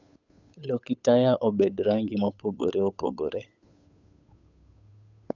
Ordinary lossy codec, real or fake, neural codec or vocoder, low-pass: none; fake; codec, 16 kHz, 8 kbps, FunCodec, trained on Chinese and English, 25 frames a second; 7.2 kHz